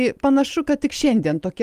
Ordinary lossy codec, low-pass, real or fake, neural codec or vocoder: Opus, 32 kbps; 14.4 kHz; real; none